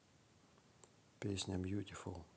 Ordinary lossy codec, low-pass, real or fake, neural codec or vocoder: none; none; real; none